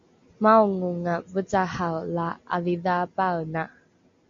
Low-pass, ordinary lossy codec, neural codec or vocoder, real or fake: 7.2 kHz; MP3, 48 kbps; none; real